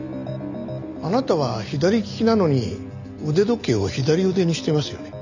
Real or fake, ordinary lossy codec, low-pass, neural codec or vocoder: real; none; 7.2 kHz; none